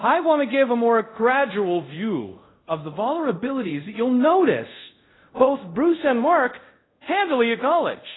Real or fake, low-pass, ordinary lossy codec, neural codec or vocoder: fake; 7.2 kHz; AAC, 16 kbps; codec, 24 kHz, 0.5 kbps, DualCodec